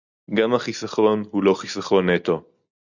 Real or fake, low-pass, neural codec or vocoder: real; 7.2 kHz; none